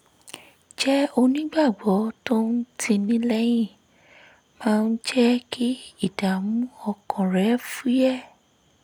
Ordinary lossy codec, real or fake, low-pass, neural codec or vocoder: none; real; 19.8 kHz; none